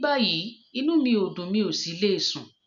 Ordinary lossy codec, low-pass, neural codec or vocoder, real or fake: none; 7.2 kHz; none; real